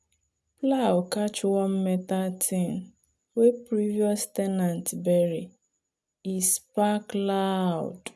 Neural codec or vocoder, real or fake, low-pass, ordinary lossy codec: none; real; none; none